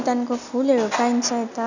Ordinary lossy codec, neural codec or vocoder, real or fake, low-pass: none; none; real; 7.2 kHz